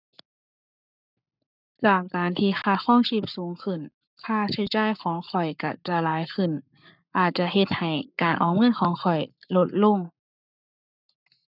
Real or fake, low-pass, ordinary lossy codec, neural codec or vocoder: fake; 5.4 kHz; none; codec, 44.1 kHz, 7.8 kbps, Pupu-Codec